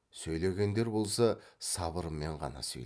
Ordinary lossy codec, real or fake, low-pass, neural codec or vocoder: none; real; none; none